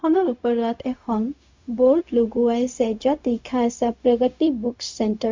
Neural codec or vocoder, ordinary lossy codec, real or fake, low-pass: codec, 16 kHz, 0.4 kbps, LongCat-Audio-Codec; MP3, 64 kbps; fake; 7.2 kHz